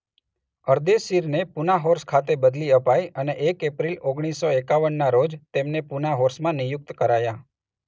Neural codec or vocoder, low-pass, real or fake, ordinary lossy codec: none; none; real; none